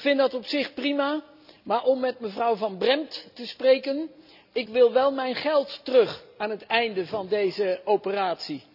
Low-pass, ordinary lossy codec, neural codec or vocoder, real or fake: 5.4 kHz; none; none; real